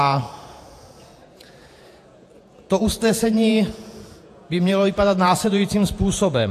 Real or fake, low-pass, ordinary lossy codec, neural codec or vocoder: fake; 14.4 kHz; AAC, 64 kbps; vocoder, 48 kHz, 128 mel bands, Vocos